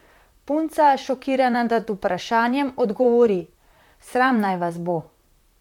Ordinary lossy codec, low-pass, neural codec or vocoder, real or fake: MP3, 96 kbps; 19.8 kHz; vocoder, 44.1 kHz, 128 mel bands, Pupu-Vocoder; fake